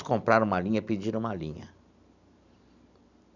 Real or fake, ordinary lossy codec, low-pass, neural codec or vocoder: real; none; 7.2 kHz; none